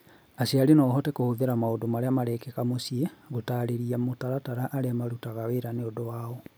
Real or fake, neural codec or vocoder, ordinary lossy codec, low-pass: real; none; none; none